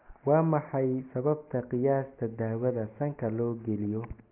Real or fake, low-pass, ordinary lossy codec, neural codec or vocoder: real; 3.6 kHz; Opus, 32 kbps; none